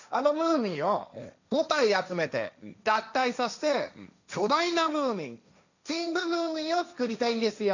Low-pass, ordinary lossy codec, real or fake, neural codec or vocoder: 7.2 kHz; none; fake; codec, 16 kHz, 1.1 kbps, Voila-Tokenizer